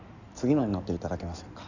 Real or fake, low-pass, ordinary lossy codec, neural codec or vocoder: fake; 7.2 kHz; none; codec, 16 kHz in and 24 kHz out, 2.2 kbps, FireRedTTS-2 codec